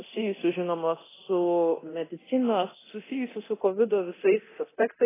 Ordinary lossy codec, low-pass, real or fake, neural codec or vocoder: AAC, 16 kbps; 3.6 kHz; fake; codec, 24 kHz, 0.9 kbps, DualCodec